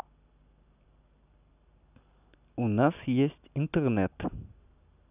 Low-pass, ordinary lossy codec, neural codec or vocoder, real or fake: 3.6 kHz; none; none; real